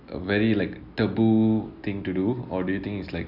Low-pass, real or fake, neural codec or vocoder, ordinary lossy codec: 5.4 kHz; real; none; none